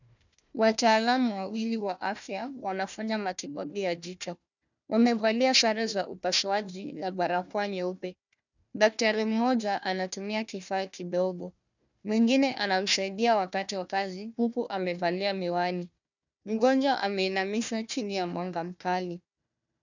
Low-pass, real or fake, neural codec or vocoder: 7.2 kHz; fake; codec, 16 kHz, 1 kbps, FunCodec, trained on Chinese and English, 50 frames a second